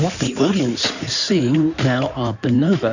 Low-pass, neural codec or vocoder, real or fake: 7.2 kHz; codec, 16 kHz in and 24 kHz out, 2.2 kbps, FireRedTTS-2 codec; fake